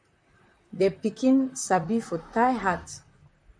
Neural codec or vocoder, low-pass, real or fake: vocoder, 44.1 kHz, 128 mel bands, Pupu-Vocoder; 9.9 kHz; fake